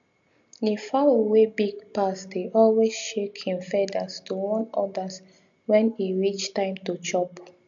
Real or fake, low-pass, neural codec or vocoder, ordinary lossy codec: real; 7.2 kHz; none; MP3, 64 kbps